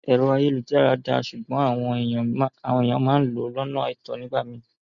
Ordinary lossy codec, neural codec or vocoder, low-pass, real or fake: none; none; 7.2 kHz; real